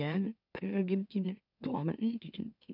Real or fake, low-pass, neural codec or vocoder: fake; 5.4 kHz; autoencoder, 44.1 kHz, a latent of 192 numbers a frame, MeloTTS